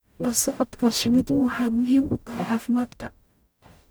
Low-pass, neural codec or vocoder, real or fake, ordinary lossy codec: none; codec, 44.1 kHz, 0.9 kbps, DAC; fake; none